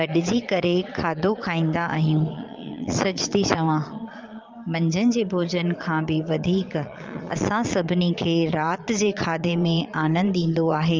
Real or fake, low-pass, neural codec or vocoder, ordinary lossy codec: fake; 7.2 kHz; vocoder, 22.05 kHz, 80 mel bands, Vocos; Opus, 32 kbps